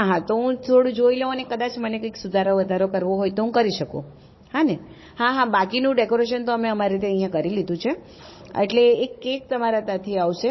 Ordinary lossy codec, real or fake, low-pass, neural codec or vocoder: MP3, 24 kbps; fake; 7.2 kHz; codec, 16 kHz, 16 kbps, FunCodec, trained on Chinese and English, 50 frames a second